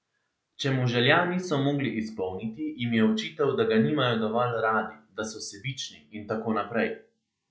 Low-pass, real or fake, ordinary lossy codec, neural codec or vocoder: none; real; none; none